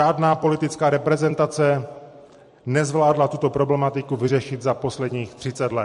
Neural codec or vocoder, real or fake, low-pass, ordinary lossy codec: vocoder, 44.1 kHz, 128 mel bands every 256 samples, BigVGAN v2; fake; 14.4 kHz; MP3, 48 kbps